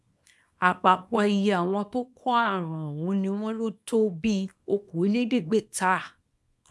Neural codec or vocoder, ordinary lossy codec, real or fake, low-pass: codec, 24 kHz, 0.9 kbps, WavTokenizer, small release; none; fake; none